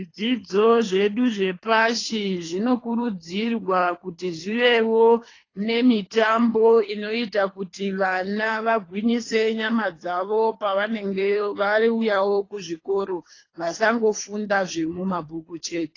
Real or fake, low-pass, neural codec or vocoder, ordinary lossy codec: fake; 7.2 kHz; codec, 24 kHz, 3 kbps, HILCodec; AAC, 32 kbps